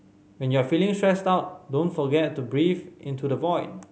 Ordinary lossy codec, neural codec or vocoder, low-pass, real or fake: none; none; none; real